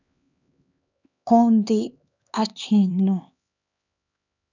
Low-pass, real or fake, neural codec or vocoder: 7.2 kHz; fake; codec, 16 kHz, 2 kbps, X-Codec, HuBERT features, trained on LibriSpeech